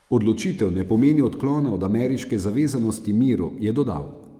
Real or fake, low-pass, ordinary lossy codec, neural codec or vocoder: fake; 19.8 kHz; Opus, 32 kbps; autoencoder, 48 kHz, 128 numbers a frame, DAC-VAE, trained on Japanese speech